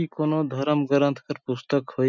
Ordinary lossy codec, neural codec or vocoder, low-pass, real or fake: none; none; 7.2 kHz; real